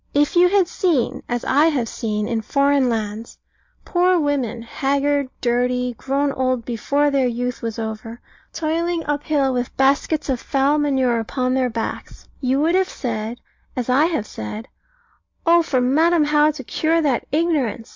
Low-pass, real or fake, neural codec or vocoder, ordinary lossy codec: 7.2 kHz; real; none; MP3, 48 kbps